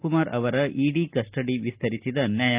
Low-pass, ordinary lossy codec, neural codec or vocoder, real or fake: 3.6 kHz; Opus, 24 kbps; none; real